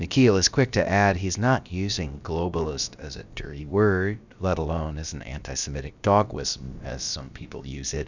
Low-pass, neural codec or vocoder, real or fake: 7.2 kHz; codec, 16 kHz, about 1 kbps, DyCAST, with the encoder's durations; fake